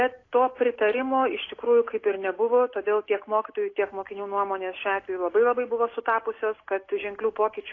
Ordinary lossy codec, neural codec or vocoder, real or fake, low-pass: AAC, 32 kbps; none; real; 7.2 kHz